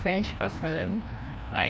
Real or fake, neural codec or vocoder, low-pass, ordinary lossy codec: fake; codec, 16 kHz, 1 kbps, FreqCodec, larger model; none; none